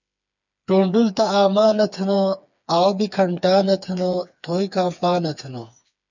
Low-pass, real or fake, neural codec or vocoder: 7.2 kHz; fake; codec, 16 kHz, 4 kbps, FreqCodec, smaller model